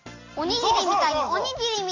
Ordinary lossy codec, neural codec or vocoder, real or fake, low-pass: AAC, 32 kbps; none; real; 7.2 kHz